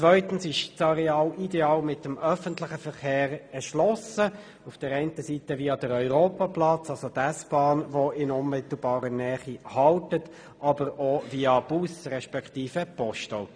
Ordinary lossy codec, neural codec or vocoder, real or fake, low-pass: none; none; real; 9.9 kHz